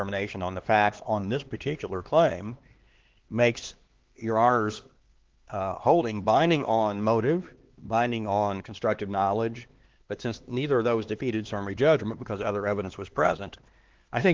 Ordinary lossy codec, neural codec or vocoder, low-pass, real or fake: Opus, 16 kbps; codec, 16 kHz, 2 kbps, X-Codec, HuBERT features, trained on LibriSpeech; 7.2 kHz; fake